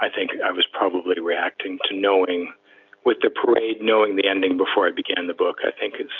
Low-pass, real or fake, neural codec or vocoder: 7.2 kHz; real; none